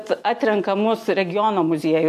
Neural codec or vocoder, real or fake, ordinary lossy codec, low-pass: none; real; MP3, 64 kbps; 14.4 kHz